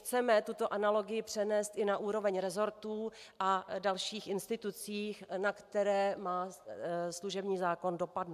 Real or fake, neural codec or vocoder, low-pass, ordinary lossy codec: real; none; 14.4 kHz; AAC, 96 kbps